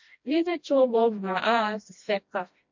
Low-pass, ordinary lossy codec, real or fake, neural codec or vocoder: 7.2 kHz; MP3, 48 kbps; fake; codec, 16 kHz, 1 kbps, FreqCodec, smaller model